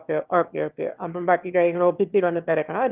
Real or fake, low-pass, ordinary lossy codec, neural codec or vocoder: fake; 3.6 kHz; Opus, 32 kbps; autoencoder, 22.05 kHz, a latent of 192 numbers a frame, VITS, trained on one speaker